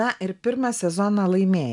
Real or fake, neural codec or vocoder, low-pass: real; none; 10.8 kHz